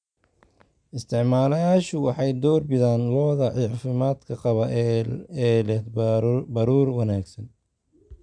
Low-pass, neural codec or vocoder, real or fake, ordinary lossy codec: 9.9 kHz; none; real; none